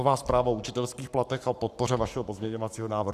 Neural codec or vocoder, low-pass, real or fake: codec, 44.1 kHz, 7.8 kbps, Pupu-Codec; 14.4 kHz; fake